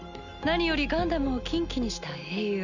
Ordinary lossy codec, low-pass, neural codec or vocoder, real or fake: none; 7.2 kHz; none; real